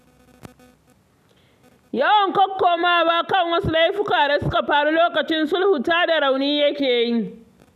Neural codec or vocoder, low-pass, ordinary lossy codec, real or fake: none; 14.4 kHz; none; real